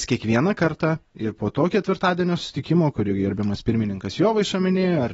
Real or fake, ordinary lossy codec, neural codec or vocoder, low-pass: real; AAC, 24 kbps; none; 19.8 kHz